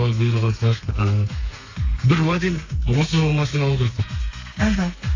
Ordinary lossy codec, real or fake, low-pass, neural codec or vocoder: AAC, 32 kbps; fake; 7.2 kHz; codec, 32 kHz, 1.9 kbps, SNAC